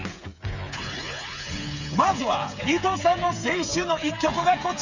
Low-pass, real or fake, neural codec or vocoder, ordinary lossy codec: 7.2 kHz; fake; codec, 16 kHz, 8 kbps, FreqCodec, smaller model; none